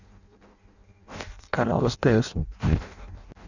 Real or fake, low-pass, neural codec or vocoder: fake; 7.2 kHz; codec, 16 kHz in and 24 kHz out, 0.6 kbps, FireRedTTS-2 codec